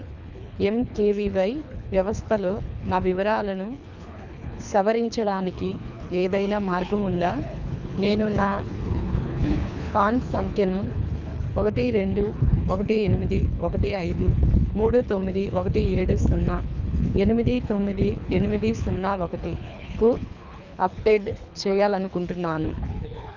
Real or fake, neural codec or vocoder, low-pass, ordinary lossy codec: fake; codec, 24 kHz, 3 kbps, HILCodec; 7.2 kHz; none